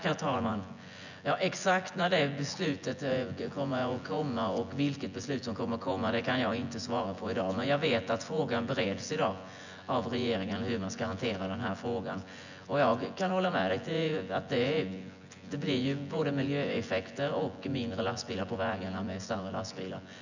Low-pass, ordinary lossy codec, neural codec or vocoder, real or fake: 7.2 kHz; none; vocoder, 24 kHz, 100 mel bands, Vocos; fake